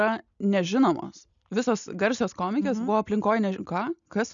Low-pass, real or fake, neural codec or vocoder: 7.2 kHz; real; none